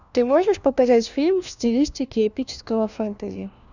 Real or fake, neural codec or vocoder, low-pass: fake; codec, 16 kHz, 1 kbps, FunCodec, trained on LibriTTS, 50 frames a second; 7.2 kHz